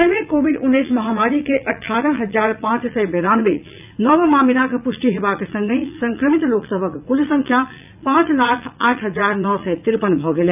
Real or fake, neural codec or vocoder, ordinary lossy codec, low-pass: fake; vocoder, 44.1 kHz, 80 mel bands, Vocos; none; 3.6 kHz